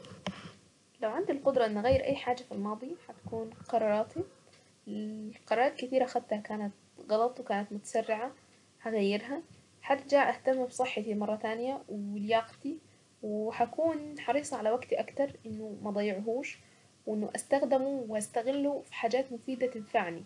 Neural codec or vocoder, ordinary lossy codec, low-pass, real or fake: none; none; 10.8 kHz; real